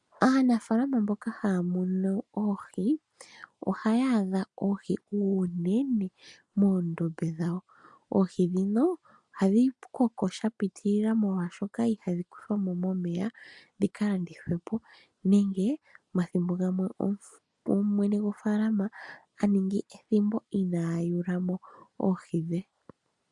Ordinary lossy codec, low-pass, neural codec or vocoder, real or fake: MP3, 96 kbps; 10.8 kHz; none; real